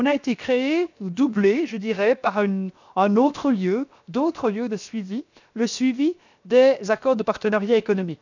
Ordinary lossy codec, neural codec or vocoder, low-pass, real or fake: none; codec, 16 kHz, 0.7 kbps, FocalCodec; 7.2 kHz; fake